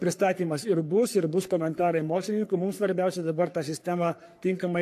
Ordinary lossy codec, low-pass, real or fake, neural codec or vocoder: AAC, 64 kbps; 14.4 kHz; fake; codec, 44.1 kHz, 3.4 kbps, Pupu-Codec